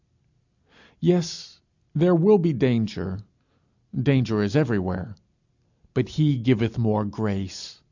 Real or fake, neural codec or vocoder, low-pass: real; none; 7.2 kHz